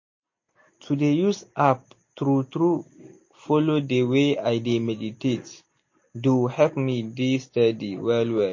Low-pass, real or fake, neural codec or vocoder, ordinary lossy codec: 7.2 kHz; real; none; MP3, 32 kbps